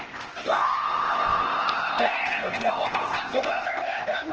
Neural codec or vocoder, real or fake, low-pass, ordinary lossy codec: codec, 16 kHz, 0.8 kbps, ZipCodec; fake; 7.2 kHz; Opus, 16 kbps